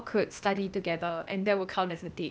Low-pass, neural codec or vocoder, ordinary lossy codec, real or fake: none; codec, 16 kHz, 0.8 kbps, ZipCodec; none; fake